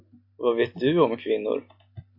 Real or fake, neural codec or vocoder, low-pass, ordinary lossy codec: real; none; 5.4 kHz; MP3, 32 kbps